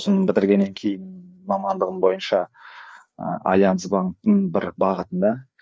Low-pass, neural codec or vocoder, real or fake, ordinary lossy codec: none; codec, 16 kHz, 4 kbps, FreqCodec, larger model; fake; none